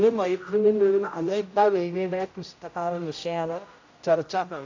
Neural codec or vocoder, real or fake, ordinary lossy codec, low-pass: codec, 16 kHz, 0.5 kbps, X-Codec, HuBERT features, trained on general audio; fake; AAC, 48 kbps; 7.2 kHz